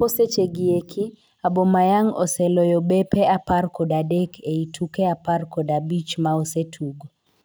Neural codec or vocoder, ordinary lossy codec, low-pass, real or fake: none; none; none; real